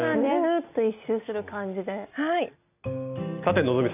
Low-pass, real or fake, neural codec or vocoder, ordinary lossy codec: 3.6 kHz; real; none; none